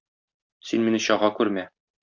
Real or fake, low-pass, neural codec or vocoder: real; 7.2 kHz; none